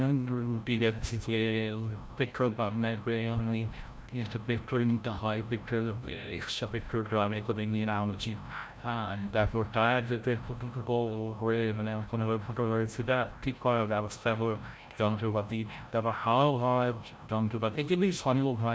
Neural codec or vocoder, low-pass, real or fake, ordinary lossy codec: codec, 16 kHz, 0.5 kbps, FreqCodec, larger model; none; fake; none